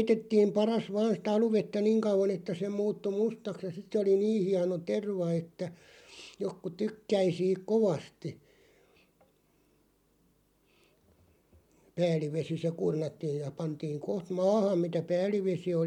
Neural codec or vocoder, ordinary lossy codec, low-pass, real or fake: none; MP3, 96 kbps; 19.8 kHz; real